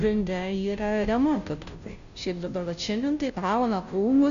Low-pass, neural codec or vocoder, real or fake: 7.2 kHz; codec, 16 kHz, 0.5 kbps, FunCodec, trained on Chinese and English, 25 frames a second; fake